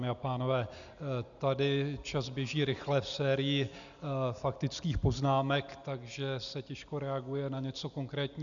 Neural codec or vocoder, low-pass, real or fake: none; 7.2 kHz; real